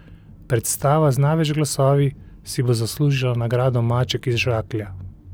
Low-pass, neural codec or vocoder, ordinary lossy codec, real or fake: none; none; none; real